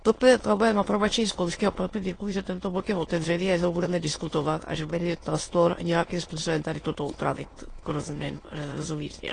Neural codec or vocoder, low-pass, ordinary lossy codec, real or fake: autoencoder, 22.05 kHz, a latent of 192 numbers a frame, VITS, trained on many speakers; 9.9 kHz; AAC, 32 kbps; fake